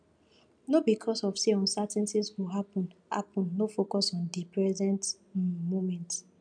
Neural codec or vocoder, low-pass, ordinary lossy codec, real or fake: none; 9.9 kHz; none; real